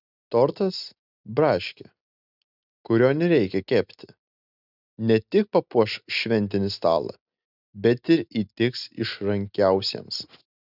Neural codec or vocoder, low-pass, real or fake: none; 5.4 kHz; real